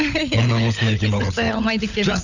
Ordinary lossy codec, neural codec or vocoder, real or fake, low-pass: none; codec, 16 kHz, 16 kbps, FunCodec, trained on LibriTTS, 50 frames a second; fake; 7.2 kHz